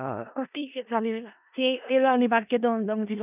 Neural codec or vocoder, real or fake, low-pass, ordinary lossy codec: codec, 16 kHz in and 24 kHz out, 0.4 kbps, LongCat-Audio-Codec, four codebook decoder; fake; 3.6 kHz; none